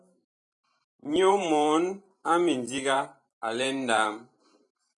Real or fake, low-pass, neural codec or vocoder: fake; 10.8 kHz; vocoder, 44.1 kHz, 128 mel bands every 512 samples, BigVGAN v2